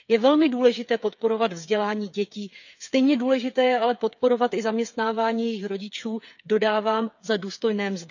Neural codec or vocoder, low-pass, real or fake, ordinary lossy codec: codec, 16 kHz, 8 kbps, FreqCodec, smaller model; 7.2 kHz; fake; none